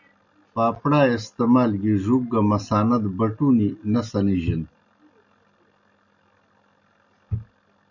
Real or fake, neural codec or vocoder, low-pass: real; none; 7.2 kHz